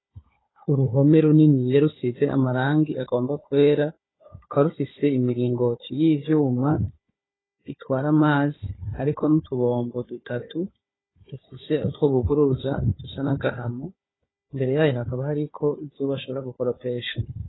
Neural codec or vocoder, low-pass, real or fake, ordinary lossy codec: codec, 16 kHz, 4 kbps, FunCodec, trained on Chinese and English, 50 frames a second; 7.2 kHz; fake; AAC, 16 kbps